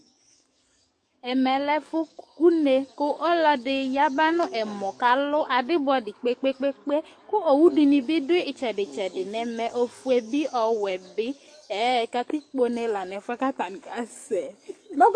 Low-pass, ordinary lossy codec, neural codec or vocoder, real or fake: 9.9 kHz; MP3, 48 kbps; codec, 44.1 kHz, 7.8 kbps, DAC; fake